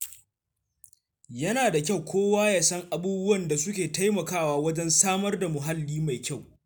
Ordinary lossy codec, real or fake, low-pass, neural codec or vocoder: none; real; none; none